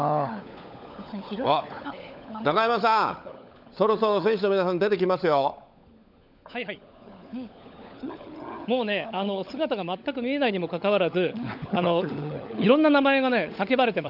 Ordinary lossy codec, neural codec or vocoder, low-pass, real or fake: none; codec, 16 kHz, 16 kbps, FunCodec, trained on LibriTTS, 50 frames a second; 5.4 kHz; fake